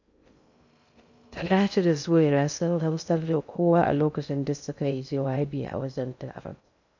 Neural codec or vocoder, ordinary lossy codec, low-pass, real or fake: codec, 16 kHz in and 24 kHz out, 0.6 kbps, FocalCodec, streaming, 2048 codes; none; 7.2 kHz; fake